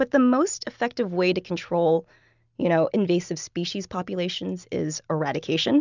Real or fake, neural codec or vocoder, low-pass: real; none; 7.2 kHz